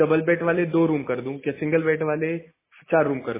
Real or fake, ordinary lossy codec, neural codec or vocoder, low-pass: real; MP3, 16 kbps; none; 3.6 kHz